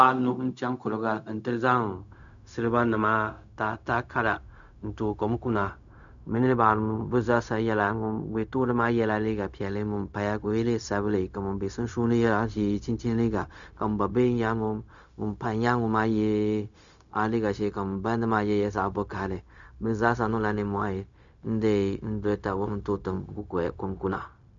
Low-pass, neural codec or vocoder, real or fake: 7.2 kHz; codec, 16 kHz, 0.4 kbps, LongCat-Audio-Codec; fake